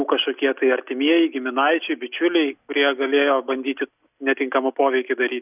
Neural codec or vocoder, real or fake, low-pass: none; real; 3.6 kHz